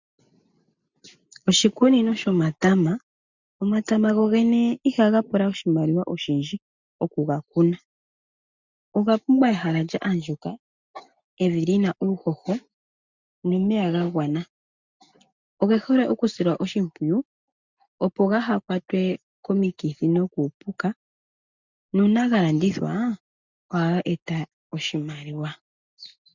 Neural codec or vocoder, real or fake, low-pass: none; real; 7.2 kHz